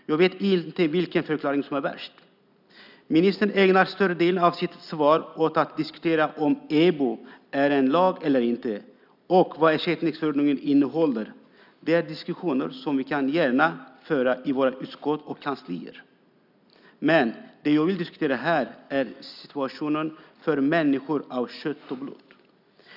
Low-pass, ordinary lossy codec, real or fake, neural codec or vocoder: 5.4 kHz; none; real; none